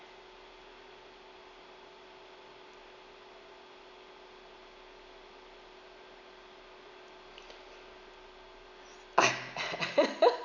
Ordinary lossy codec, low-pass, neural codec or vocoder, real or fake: none; 7.2 kHz; none; real